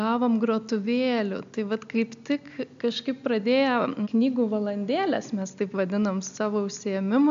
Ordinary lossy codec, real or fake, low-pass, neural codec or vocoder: AAC, 64 kbps; real; 7.2 kHz; none